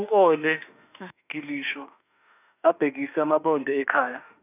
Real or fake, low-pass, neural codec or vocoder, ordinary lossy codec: fake; 3.6 kHz; autoencoder, 48 kHz, 32 numbers a frame, DAC-VAE, trained on Japanese speech; none